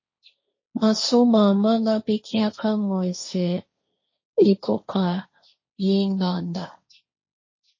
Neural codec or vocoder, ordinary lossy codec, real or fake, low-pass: codec, 16 kHz, 1.1 kbps, Voila-Tokenizer; MP3, 32 kbps; fake; 7.2 kHz